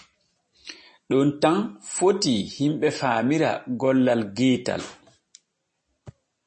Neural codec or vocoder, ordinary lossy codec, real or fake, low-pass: none; MP3, 32 kbps; real; 10.8 kHz